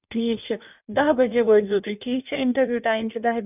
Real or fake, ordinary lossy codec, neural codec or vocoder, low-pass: fake; none; codec, 16 kHz in and 24 kHz out, 1.1 kbps, FireRedTTS-2 codec; 3.6 kHz